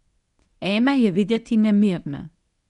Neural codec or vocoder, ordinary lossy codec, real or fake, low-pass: codec, 24 kHz, 0.9 kbps, WavTokenizer, medium speech release version 1; none; fake; 10.8 kHz